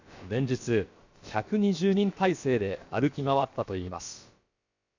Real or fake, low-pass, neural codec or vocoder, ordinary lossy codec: fake; 7.2 kHz; codec, 16 kHz, about 1 kbps, DyCAST, with the encoder's durations; Opus, 64 kbps